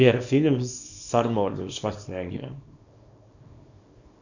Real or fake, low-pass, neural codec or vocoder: fake; 7.2 kHz; codec, 24 kHz, 0.9 kbps, WavTokenizer, small release